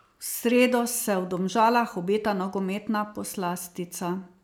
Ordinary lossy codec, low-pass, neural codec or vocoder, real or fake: none; none; none; real